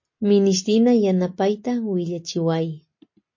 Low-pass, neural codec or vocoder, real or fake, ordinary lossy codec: 7.2 kHz; none; real; MP3, 32 kbps